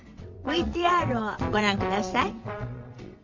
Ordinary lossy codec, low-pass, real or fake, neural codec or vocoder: MP3, 48 kbps; 7.2 kHz; fake; vocoder, 22.05 kHz, 80 mel bands, WaveNeXt